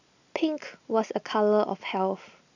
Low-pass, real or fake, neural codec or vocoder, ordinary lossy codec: 7.2 kHz; real; none; none